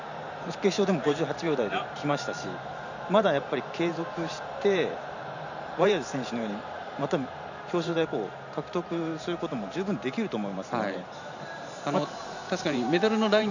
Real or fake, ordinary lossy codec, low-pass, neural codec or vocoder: fake; none; 7.2 kHz; vocoder, 44.1 kHz, 128 mel bands every 512 samples, BigVGAN v2